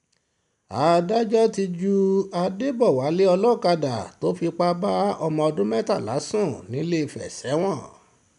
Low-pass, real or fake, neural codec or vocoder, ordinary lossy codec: 10.8 kHz; real; none; none